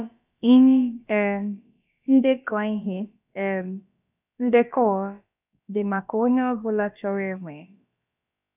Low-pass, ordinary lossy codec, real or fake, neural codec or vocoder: 3.6 kHz; none; fake; codec, 16 kHz, about 1 kbps, DyCAST, with the encoder's durations